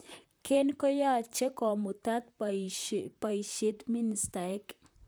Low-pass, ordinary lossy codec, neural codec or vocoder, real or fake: none; none; vocoder, 44.1 kHz, 128 mel bands, Pupu-Vocoder; fake